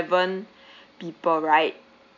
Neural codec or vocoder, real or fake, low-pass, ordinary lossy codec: none; real; 7.2 kHz; none